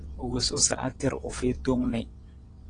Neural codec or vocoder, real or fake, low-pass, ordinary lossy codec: vocoder, 22.05 kHz, 80 mel bands, WaveNeXt; fake; 9.9 kHz; AAC, 32 kbps